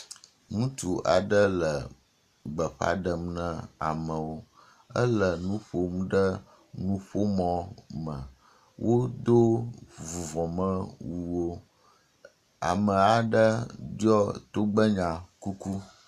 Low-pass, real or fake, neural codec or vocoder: 14.4 kHz; real; none